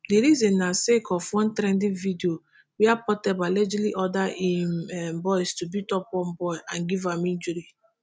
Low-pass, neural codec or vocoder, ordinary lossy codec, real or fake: none; none; none; real